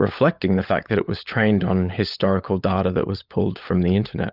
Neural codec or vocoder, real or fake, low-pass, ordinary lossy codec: codec, 16 kHz, 16 kbps, FunCodec, trained on LibriTTS, 50 frames a second; fake; 5.4 kHz; Opus, 24 kbps